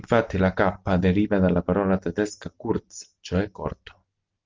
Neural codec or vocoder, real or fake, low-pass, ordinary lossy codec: none; real; 7.2 kHz; Opus, 32 kbps